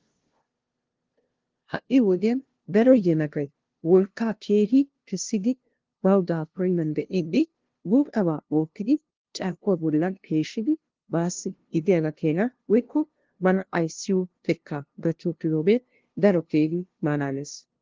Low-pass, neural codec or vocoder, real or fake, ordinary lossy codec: 7.2 kHz; codec, 16 kHz, 0.5 kbps, FunCodec, trained on LibriTTS, 25 frames a second; fake; Opus, 16 kbps